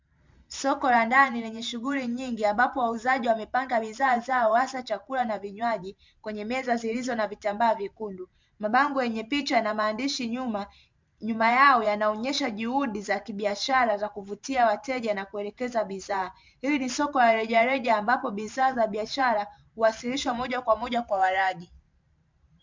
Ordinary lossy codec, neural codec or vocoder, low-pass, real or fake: MP3, 64 kbps; vocoder, 44.1 kHz, 128 mel bands every 512 samples, BigVGAN v2; 7.2 kHz; fake